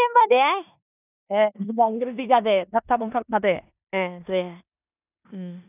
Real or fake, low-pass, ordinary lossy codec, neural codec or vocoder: fake; 3.6 kHz; none; codec, 16 kHz in and 24 kHz out, 0.9 kbps, LongCat-Audio-Codec, four codebook decoder